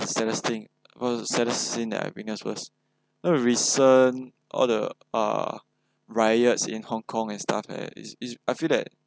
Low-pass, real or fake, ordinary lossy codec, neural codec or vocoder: none; real; none; none